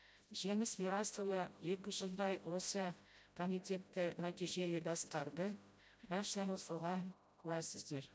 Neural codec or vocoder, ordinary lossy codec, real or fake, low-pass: codec, 16 kHz, 0.5 kbps, FreqCodec, smaller model; none; fake; none